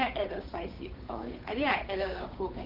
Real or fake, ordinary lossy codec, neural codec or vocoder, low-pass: fake; Opus, 24 kbps; codec, 16 kHz, 8 kbps, FunCodec, trained on Chinese and English, 25 frames a second; 5.4 kHz